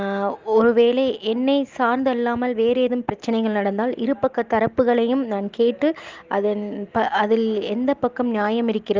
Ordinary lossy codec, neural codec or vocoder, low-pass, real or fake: Opus, 32 kbps; none; 7.2 kHz; real